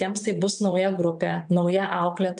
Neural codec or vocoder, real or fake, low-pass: vocoder, 22.05 kHz, 80 mel bands, WaveNeXt; fake; 9.9 kHz